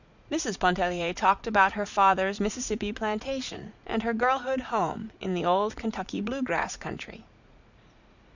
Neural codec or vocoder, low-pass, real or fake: vocoder, 44.1 kHz, 80 mel bands, Vocos; 7.2 kHz; fake